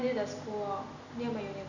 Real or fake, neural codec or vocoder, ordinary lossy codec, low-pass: real; none; none; 7.2 kHz